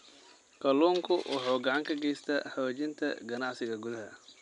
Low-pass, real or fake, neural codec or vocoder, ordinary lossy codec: 10.8 kHz; real; none; none